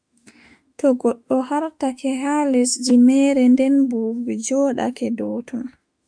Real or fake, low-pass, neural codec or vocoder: fake; 9.9 kHz; autoencoder, 48 kHz, 32 numbers a frame, DAC-VAE, trained on Japanese speech